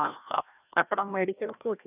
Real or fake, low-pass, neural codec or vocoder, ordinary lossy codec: fake; 3.6 kHz; codec, 16 kHz, 1 kbps, FreqCodec, larger model; none